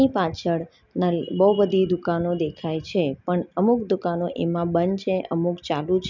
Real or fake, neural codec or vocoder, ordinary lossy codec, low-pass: real; none; none; 7.2 kHz